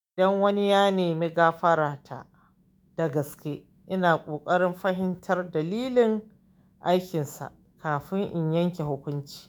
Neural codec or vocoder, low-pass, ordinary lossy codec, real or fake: autoencoder, 48 kHz, 128 numbers a frame, DAC-VAE, trained on Japanese speech; none; none; fake